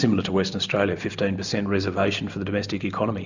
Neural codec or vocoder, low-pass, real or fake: none; 7.2 kHz; real